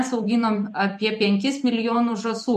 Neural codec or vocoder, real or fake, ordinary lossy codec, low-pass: vocoder, 44.1 kHz, 128 mel bands every 256 samples, BigVGAN v2; fake; MP3, 64 kbps; 14.4 kHz